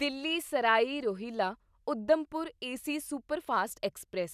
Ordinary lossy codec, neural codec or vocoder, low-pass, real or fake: none; none; 14.4 kHz; real